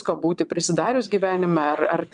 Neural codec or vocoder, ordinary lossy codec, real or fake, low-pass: vocoder, 22.05 kHz, 80 mel bands, WaveNeXt; MP3, 96 kbps; fake; 9.9 kHz